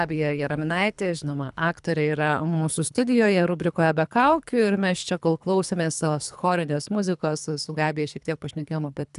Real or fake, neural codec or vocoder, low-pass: fake; codec, 24 kHz, 3 kbps, HILCodec; 10.8 kHz